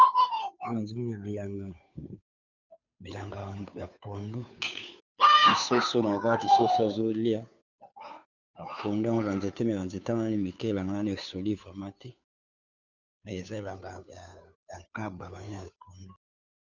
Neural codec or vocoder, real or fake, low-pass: codec, 16 kHz, 2 kbps, FunCodec, trained on Chinese and English, 25 frames a second; fake; 7.2 kHz